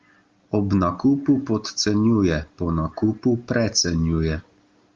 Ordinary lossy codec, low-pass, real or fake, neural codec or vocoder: Opus, 32 kbps; 7.2 kHz; real; none